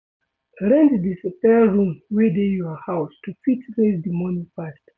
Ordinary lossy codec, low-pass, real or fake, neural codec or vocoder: none; none; real; none